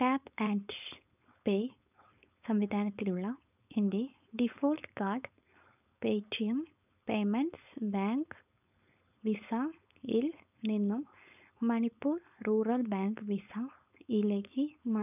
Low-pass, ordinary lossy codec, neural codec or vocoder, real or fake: 3.6 kHz; none; codec, 16 kHz, 4.8 kbps, FACodec; fake